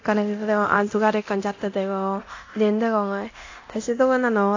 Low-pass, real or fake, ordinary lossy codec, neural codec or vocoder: 7.2 kHz; fake; AAC, 48 kbps; codec, 24 kHz, 0.9 kbps, DualCodec